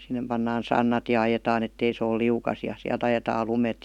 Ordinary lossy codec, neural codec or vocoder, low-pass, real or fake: none; none; 19.8 kHz; real